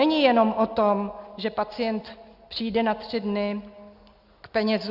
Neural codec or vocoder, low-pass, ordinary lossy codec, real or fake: none; 5.4 kHz; Opus, 64 kbps; real